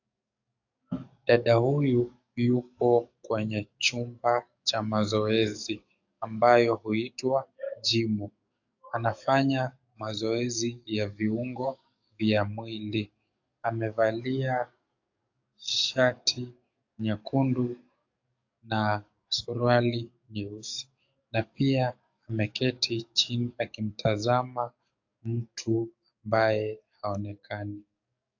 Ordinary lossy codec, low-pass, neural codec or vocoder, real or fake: AAC, 48 kbps; 7.2 kHz; codec, 44.1 kHz, 7.8 kbps, DAC; fake